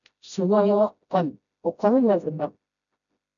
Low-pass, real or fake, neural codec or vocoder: 7.2 kHz; fake; codec, 16 kHz, 0.5 kbps, FreqCodec, smaller model